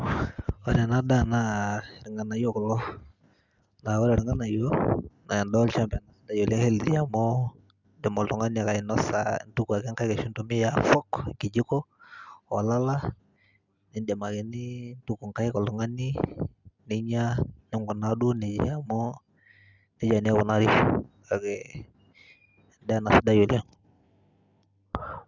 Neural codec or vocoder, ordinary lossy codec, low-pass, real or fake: none; Opus, 64 kbps; 7.2 kHz; real